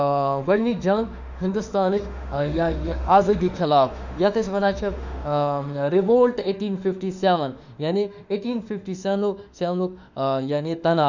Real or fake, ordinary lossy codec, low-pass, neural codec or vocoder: fake; none; 7.2 kHz; autoencoder, 48 kHz, 32 numbers a frame, DAC-VAE, trained on Japanese speech